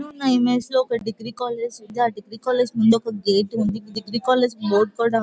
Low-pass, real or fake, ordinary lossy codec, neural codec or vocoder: none; real; none; none